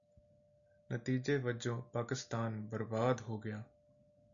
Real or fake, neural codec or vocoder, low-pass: real; none; 7.2 kHz